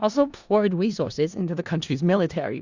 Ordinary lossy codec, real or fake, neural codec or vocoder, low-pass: Opus, 64 kbps; fake; codec, 16 kHz in and 24 kHz out, 0.4 kbps, LongCat-Audio-Codec, four codebook decoder; 7.2 kHz